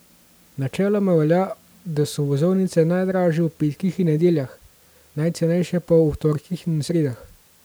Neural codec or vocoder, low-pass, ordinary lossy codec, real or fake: none; none; none; real